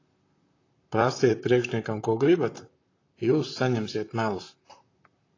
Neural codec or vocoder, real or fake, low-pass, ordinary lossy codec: vocoder, 44.1 kHz, 128 mel bands, Pupu-Vocoder; fake; 7.2 kHz; AAC, 32 kbps